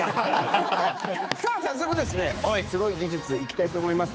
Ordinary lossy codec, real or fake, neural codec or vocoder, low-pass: none; fake; codec, 16 kHz, 4 kbps, X-Codec, HuBERT features, trained on general audio; none